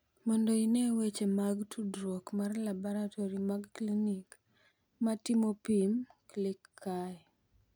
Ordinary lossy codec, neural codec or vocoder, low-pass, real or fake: none; none; none; real